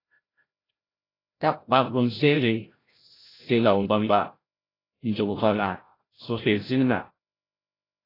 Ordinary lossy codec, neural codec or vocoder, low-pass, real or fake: AAC, 24 kbps; codec, 16 kHz, 0.5 kbps, FreqCodec, larger model; 5.4 kHz; fake